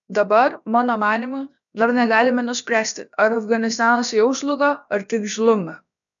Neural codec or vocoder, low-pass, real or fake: codec, 16 kHz, about 1 kbps, DyCAST, with the encoder's durations; 7.2 kHz; fake